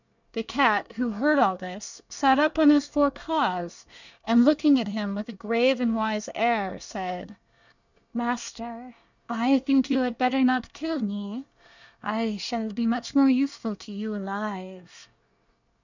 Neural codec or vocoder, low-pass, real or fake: codec, 24 kHz, 1 kbps, SNAC; 7.2 kHz; fake